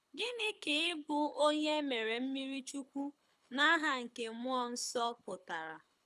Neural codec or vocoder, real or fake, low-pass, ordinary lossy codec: codec, 24 kHz, 6 kbps, HILCodec; fake; none; none